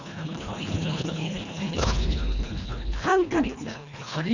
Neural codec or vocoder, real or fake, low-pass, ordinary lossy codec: codec, 24 kHz, 1.5 kbps, HILCodec; fake; 7.2 kHz; none